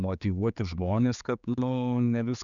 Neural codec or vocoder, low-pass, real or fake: codec, 16 kHz, 4 kbps, X-Codec, HuBERT features, trained on general audio; 7.2 kHz; fake